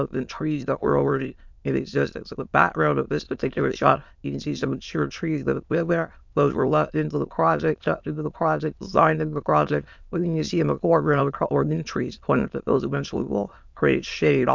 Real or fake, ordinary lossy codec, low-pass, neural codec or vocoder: fake; MP3, 64 kbps; 7.2 kHz; autoencoder, 22.05 kHz, a latent of 192 numbers a frame, VITS, trained on many speakers